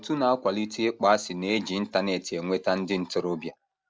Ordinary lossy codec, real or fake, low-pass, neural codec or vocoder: none; real; none; none